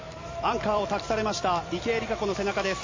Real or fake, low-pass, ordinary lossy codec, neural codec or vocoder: fake; 7.2 kHz; MP3, 32 kbps; vocoder, 44.1 kHz, 128 mel bands every 256 samples, BigVGAN v2